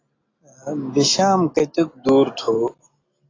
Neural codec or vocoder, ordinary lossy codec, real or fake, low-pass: none; AAC, 32 kbps; real; 7.2 kHz